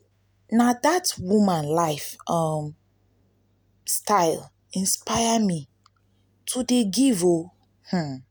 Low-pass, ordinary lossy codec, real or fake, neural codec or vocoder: none; none; real; none